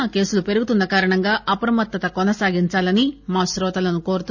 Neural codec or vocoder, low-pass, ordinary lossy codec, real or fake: none; 7.2 kHz; none; real